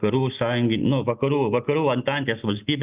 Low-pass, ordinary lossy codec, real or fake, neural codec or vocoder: 3.6 kHz; Opus, 24 kbps; real; none